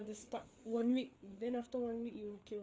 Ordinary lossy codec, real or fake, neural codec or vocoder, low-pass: none; fake; codec, 16 kHz, 4 kbps, FreqCodec, larger model; none